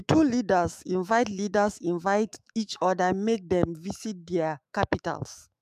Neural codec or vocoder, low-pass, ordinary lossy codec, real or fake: autoencoder, 48 kHz, 128 numbers a frame, DAC-VAE, trained on Japanese speech; 14.4 kHz; MP3, 96 kbps; fake